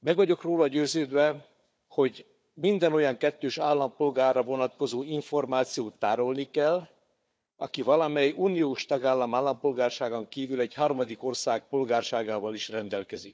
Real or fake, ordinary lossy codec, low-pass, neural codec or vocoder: fake; none; none; codec, 16 kHz, 4 kbps, FunCodec, trained on Chinese and English, 50 frames a second